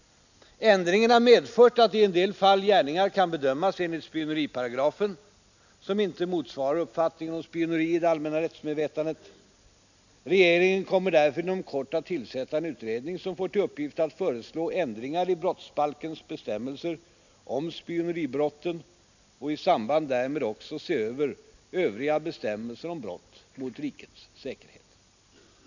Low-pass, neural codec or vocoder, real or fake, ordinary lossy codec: 7.2 kHz; none; real; none